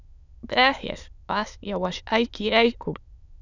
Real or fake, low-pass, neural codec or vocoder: fake; 7.2 kHz; autoencoder, 22.05 kHz, a latent of 192 numbers a frame, VITS, trained on many speakers